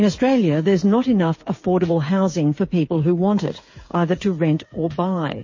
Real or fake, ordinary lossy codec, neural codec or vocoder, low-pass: fake; MP3, 32 kbps; codec, 16 kHz, 8 kbps, FreqCodec, smaller model; 7.2 kHz